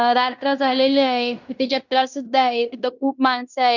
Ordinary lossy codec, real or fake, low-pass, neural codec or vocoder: none; fake; 7.2 kHz; codec, 16 kHz in and 24 kHz out, 0.9 kbps, LongCat-Audio-Codec, fine tuned four codebook decoder